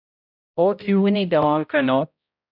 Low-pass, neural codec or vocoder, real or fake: 5.4 kHz; codec, 16 kHz, 0.5 kbps, X-Codec, HuBERT features, trained on balanced general audio; fake